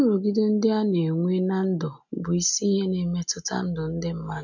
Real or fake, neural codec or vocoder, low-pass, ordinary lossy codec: real; none; 7.2 kHz; none